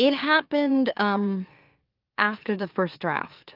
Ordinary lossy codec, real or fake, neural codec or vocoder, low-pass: Opus, 32 kbps; fake; autoencoder, 44.1 kHz, a latent of 192 numbers a frame, MeloTTS; 5.4 kHz